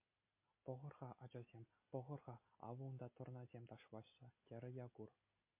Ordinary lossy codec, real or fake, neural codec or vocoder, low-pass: MP3, 24 kbps; real; none; 3.6 kHz